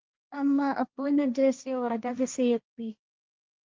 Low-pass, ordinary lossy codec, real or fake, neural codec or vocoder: 7.2 kHz; Opus, 32 kbps; fake; codec, 16 kHz, 1.1 kbps, Voila-Tokenizer